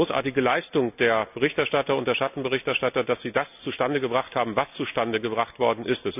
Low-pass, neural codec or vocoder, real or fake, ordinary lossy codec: 3.6 kHz; none; real; none